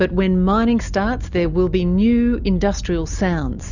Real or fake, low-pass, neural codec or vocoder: real; 7.2 kHz; none